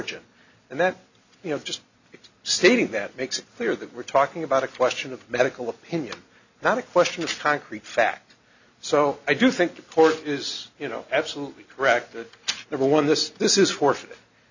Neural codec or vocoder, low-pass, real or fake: none; 7.2 kHz; real